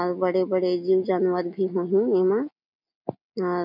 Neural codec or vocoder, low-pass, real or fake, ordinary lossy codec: none; 5.4 kHz; real; none